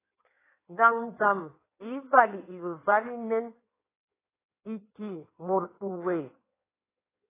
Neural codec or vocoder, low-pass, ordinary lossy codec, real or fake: codec, 16 kHz in and 24 kHz out, 1.1 kbps, FireRedTTS-2 codec; 3.6 kHz; AAC, 16 kbps; fake